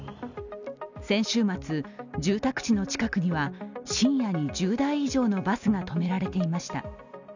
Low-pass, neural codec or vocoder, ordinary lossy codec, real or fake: 7.2 kHz; none; none; real